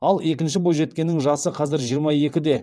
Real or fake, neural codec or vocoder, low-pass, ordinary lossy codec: fake; vocoder, 22.05 kHz, 80 mel bands, WaveNeXt; none; none